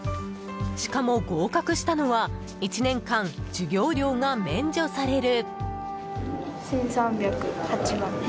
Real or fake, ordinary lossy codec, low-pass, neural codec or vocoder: real; none; none; none